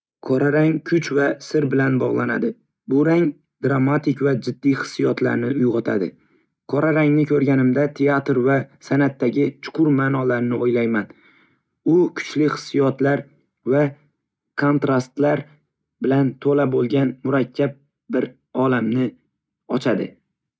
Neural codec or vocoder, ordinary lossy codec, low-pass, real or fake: none; none; none; real